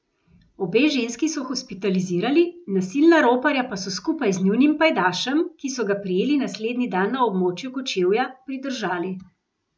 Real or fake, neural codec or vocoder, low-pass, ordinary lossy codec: real; none; none; none